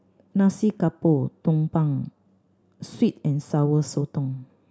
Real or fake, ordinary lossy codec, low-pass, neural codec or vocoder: real; none; none; none